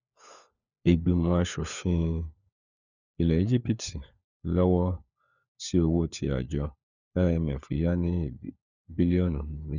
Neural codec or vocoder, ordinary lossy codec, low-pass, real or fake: codec, 16 kHz, 4 kbps, FunCodec, trained on LibriTTS, 50 frames a second; none; 7.2 kHz; fake